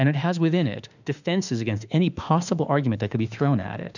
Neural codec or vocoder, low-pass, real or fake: autoencoder, 48 kHz, 32 numbers a frame, DAC-VAE, trained on Japanese speech; 7.2 kHz; fake